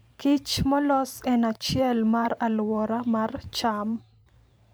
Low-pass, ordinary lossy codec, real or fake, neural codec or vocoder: none; none; fake; vocoder, 44.1 kHz, 128 mel bands every 512 samples, BigVGAN v2